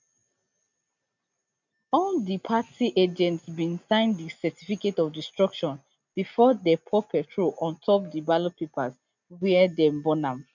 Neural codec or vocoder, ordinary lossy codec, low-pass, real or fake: none; none; 7.2 kHz; real